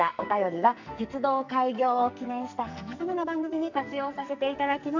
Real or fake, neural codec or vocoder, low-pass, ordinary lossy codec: fake; codec, 44.1 kHz, 2.6 kbps, SNAC; 7.2 kHz; none